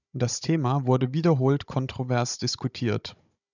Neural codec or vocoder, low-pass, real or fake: codec, 16 kHz, 16 kbps, FunCodec, trained on Chinese and English, 50 frames a second; 7.2 kHz; fake